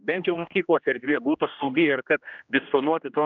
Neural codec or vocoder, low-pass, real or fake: codec, 16 kHz, 1 kbps, X-Codec, HuBERT features, trained on general audio; 7.2 kHz; fake